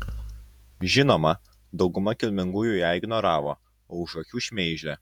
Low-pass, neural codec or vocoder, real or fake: 19.8 kHz; vocoder, 48 kHz, 128 mel bands, Vocos; fake